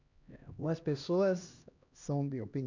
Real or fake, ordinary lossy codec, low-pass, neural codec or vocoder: fake; none; 7.2 kHz; codec, 16 kHz, 1 kbps, X-Codec, HuBERT features, trained on LibriSpeech